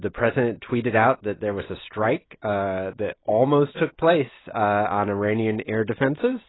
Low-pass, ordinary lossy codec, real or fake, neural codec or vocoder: 7.2 kHz; AAC, 16 kbps; real; none